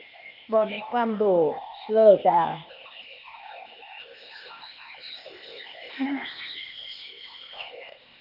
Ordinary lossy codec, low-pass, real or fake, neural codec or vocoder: none; 5.4 kHz; fake; codec, 16 kHz, 0.8 kbps, ZipCodec